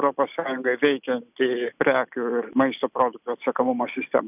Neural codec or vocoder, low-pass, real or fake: none; 3.6 kHz; real